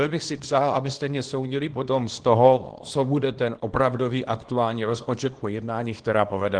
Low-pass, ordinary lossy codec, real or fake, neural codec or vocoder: 9.9 kHz; Opus, 16 kbps; fake; codec, 24 kHz, 0.9 kbps, WavTokenizer, small release